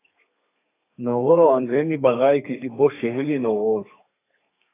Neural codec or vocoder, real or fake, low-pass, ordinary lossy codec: codec, 32 kHz, 1.9 kbps, SNAC; fake; 3.6 kHz; AAC, 24 kbps